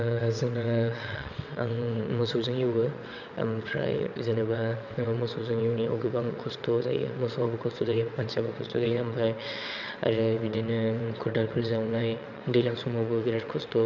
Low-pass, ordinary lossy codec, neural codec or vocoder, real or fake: 7.2 kHz; none; vocoder, 22.05 kHz, 80 mel bands, WaveNeXt; fake